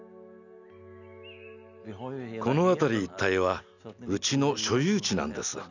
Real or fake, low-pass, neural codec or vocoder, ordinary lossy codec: real; 7.2 kHz; none; none